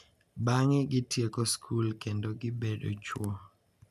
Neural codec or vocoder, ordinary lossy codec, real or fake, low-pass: none; none; real; 14.4 kHz